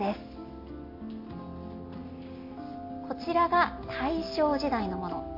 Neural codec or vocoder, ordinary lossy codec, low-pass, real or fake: none; MP3, 32 kbps; 5.4 kHz; real